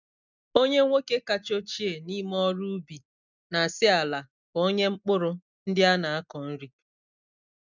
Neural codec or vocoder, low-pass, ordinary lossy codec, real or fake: none; 7.2 kHz; none; real